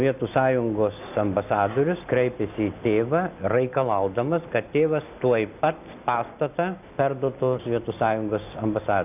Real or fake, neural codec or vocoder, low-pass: real; none; 3.6 kHz